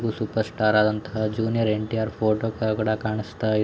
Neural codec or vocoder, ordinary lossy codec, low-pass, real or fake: none; none; none; real